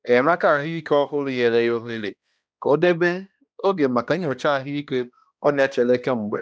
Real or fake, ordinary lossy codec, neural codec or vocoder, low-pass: fake; none; codec, 16 kHz, 1 kbps, X-Codec, HuBERT features, trained on balanced general audio; none